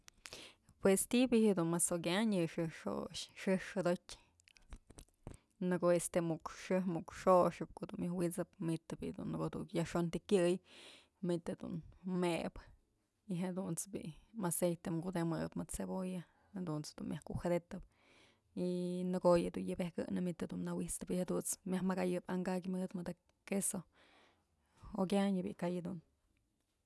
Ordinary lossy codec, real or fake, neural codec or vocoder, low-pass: none; real; none; none